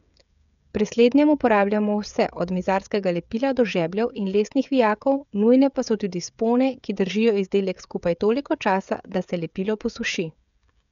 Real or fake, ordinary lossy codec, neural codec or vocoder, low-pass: fake; none; codec, 16 kHz, 16 kbps, FreqCodec, smaller model; 7.2 kHz